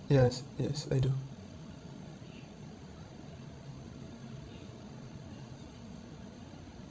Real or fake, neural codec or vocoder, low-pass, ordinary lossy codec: fake; codec, 16 kHz, 16 kbps, FreqCodec, larger model; none; none